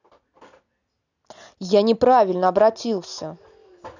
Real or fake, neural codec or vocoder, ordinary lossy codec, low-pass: real; none; none; 7.2 kHz